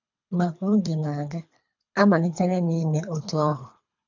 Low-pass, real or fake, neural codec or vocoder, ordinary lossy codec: 7.2 kHz; fake; codec, 24 kHz, 3 kbps, HILCodec; none